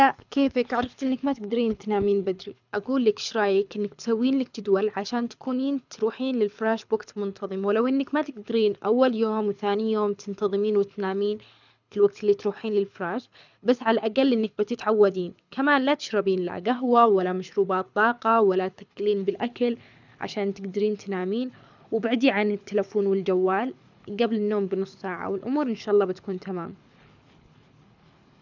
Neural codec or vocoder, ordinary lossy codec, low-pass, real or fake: codec, 24 kHz, 6 kbps, HILCodec; none; 7.2 kHz; fake